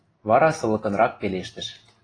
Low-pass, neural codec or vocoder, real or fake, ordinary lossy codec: 9.9 kHz; none; real; AAC, 32 kbps